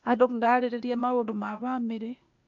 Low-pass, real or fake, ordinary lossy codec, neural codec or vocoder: 7.2 kHz; fake; MP3, 96 kbps; codec, 16 kHz, 0.8 kbps, ZipCodec